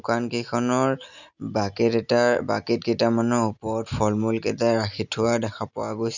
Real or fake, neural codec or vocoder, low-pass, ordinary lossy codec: real; none; 7.2 kHz; none